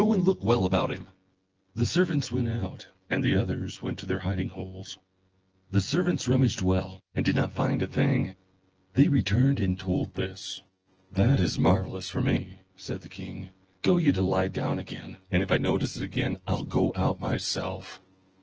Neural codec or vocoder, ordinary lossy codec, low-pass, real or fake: vocoder, 24 kHz, 100 mel bands, Vocos; Opus, 16 kbps; 7.2 kHz; fake